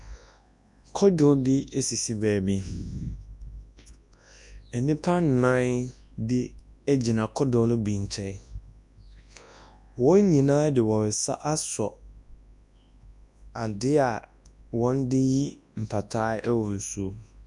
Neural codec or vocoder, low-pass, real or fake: codec, 24 kHz, 0.9 kbps, WavTokenizer, large speech release; 10.8 kHz; fake